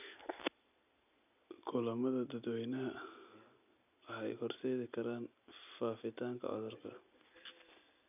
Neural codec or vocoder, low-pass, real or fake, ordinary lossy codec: none; 3.6 kHz; real; none